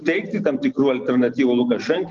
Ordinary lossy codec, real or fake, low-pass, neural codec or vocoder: Opus, 24 kbps; real; 7.2 kHz; none